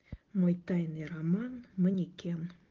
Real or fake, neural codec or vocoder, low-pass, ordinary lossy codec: real; none; 7.2 kHz; Opus, 16 kbps